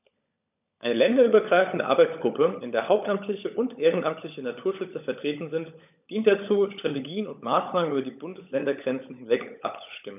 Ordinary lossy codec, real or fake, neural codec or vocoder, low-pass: none; fake; codec, 16 kHz, 16 kbps, FunCodec, trained on LibriTTS, 50 frames a second; 3.6 kHz